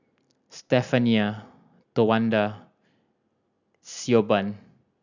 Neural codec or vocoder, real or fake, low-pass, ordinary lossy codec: none; real; 7.2 kHz; none